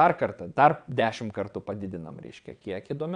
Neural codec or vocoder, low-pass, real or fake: vocoder, 22.05 kHz, 80 mel bands, WaveNeXt; 9.9 kHz; fake